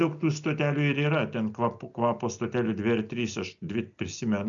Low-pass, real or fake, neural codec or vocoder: 7.2 kHz; real; none